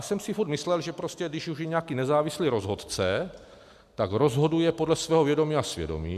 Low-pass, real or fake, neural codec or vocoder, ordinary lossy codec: 14.4 kHz; real; none; MP3, 96 kbps